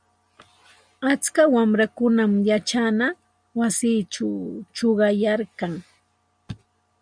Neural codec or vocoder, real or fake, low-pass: none; real; 9.9 kHz